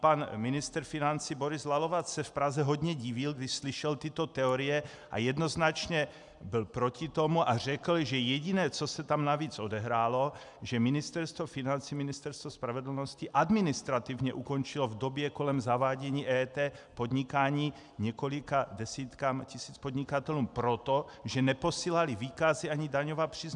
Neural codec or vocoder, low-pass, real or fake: none; 10.8 kHz; real